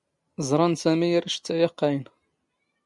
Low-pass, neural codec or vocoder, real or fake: 10.8 kHz; none; real